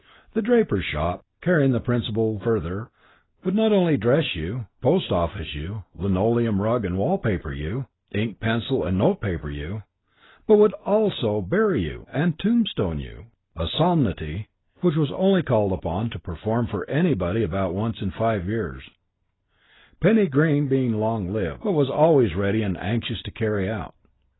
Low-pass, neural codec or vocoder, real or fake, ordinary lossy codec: 7.2 kHz; none; real; AAC, 16 kbps